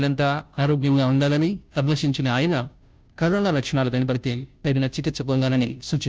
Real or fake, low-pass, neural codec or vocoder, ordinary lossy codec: fake; none; codec, 16 kHz, 0.5 kbps, FunCodec, trained on Chinese and English, 25 frames a second; none